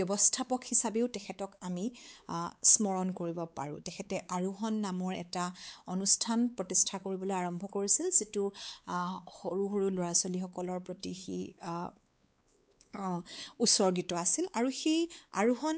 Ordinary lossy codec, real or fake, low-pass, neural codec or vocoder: none; fake; none; codec, 16 kHz, 4 kbps, X-Codec, WavLM features, trained on Multilingual LibriSpeech